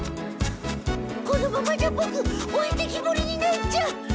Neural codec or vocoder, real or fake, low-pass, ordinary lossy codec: none; real; none; none